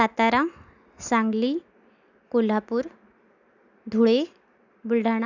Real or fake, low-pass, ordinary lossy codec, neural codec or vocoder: real; 7.2 kHz; none; none